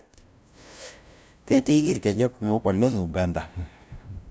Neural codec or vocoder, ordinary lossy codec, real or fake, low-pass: codec, 16 kHz, 0.5 kbps, FunCodec, trained on LibriTTS, 25 frames a second; none; fake; none